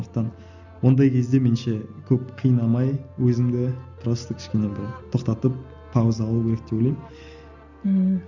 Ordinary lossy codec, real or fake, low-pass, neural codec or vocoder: none; real; 7.2 kHz; none